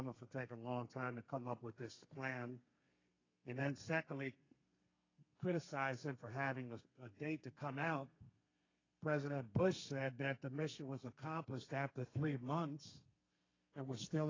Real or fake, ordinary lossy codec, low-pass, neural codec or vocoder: fake; AAC, 32 kbps; 7.2 kHz; codec, 32 kHz, 1.9 kbps, SNAC